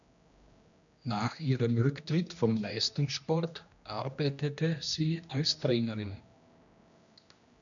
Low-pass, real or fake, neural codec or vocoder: 7.2 kHz; fake; codec, 16 kHz, 1 kbps, X-Codec, HuBERT features, trained on general audio